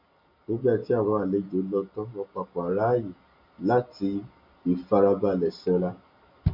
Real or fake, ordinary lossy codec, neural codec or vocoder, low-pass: real; none; none; 5.4 kHz